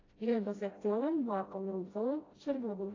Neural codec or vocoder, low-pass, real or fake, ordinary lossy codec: codec, 16 kHz, 0.5 kbps, FreqCodec, smaller model; 7.2 kHz; fake; AAC, 32 kbps